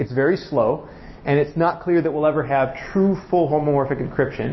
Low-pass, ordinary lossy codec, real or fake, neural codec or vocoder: 7.2 kHz; MP3, 24 kbps; real; none